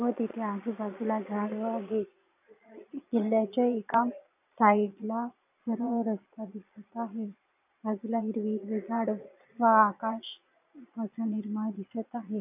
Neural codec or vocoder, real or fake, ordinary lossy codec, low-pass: vocoder, 22.05 kHz, 80 mel bands, Vocos; fake; none; 3.6 kHz